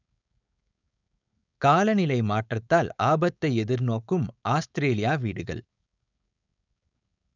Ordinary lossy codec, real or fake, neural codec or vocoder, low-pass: none; fake; codec, 16 kHz, 4.8 kbps, FACodec; 7.2 kHz